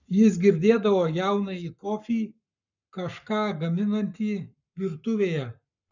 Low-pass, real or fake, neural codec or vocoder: 7.2 kHz; fake; codec, 44.1 kHz, 7.8 kbps, Pupu-Codec